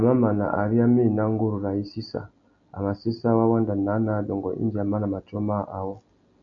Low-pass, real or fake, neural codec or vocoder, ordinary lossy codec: 7.2 kHz; real; none; MP3, 64 kbps